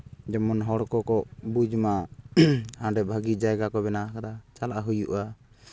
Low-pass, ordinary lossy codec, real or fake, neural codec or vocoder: none; none; real; none